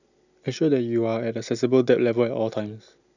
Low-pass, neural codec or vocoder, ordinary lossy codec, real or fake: 7.2 kHz; none; none; real